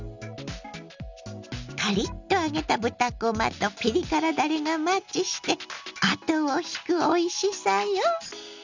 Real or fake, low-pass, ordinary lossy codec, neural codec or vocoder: real; 7.2 kHz; Opus, 64 kbps; none